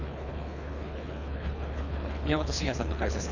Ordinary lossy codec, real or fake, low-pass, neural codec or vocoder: none; fake; 7.2 kHz; codec, 24 kHz, 3 kbps, HILCodec